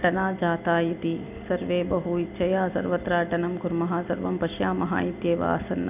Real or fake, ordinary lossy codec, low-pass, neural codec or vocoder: real; none; 3.6 kHz; none